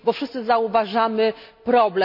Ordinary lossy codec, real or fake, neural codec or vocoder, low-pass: none; real; none; 5.4 kHz